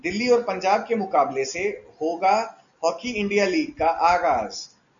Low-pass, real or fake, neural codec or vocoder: 7.2 kHz; real; none